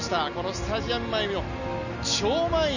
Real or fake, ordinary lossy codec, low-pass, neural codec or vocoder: real; none; 7.2 kHz; none